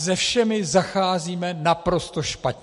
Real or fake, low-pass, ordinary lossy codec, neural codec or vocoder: real; 14.4 kHz; MP3, 48 kbps; none